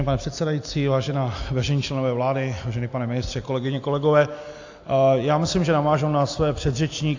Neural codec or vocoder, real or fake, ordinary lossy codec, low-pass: none; real; AAC, 48 kbps; 7.2 kHz